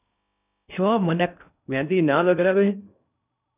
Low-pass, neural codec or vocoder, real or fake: 3.6 kHz; codec, 16 kHz in and 24 kHz out, 0.6 kbps, FocalCodec, streaming, 2048 codes; fake